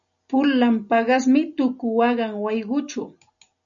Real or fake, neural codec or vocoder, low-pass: real; none; 7.2 kHz